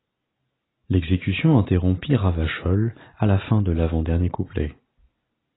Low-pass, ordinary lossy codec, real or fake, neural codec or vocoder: 7.2 kHz; AAC, 16 kbps; real; none